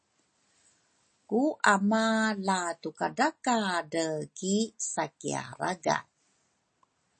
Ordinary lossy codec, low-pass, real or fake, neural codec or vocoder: MP3, 32 kbps; 10.8 kHz; real; none